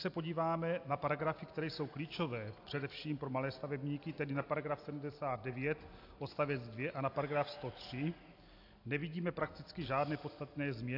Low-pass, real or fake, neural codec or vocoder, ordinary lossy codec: 5.4 kHz; real; none; AAC, 32 kbps